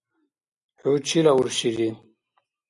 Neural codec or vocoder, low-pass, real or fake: none; 10.8 kHz; real